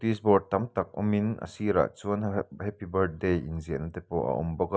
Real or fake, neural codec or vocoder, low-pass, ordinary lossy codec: real; none; none; none